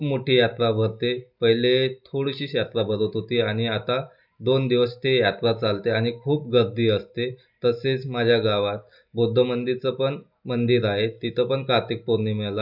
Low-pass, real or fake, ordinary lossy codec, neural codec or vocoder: 5.4 kHz; real; none; none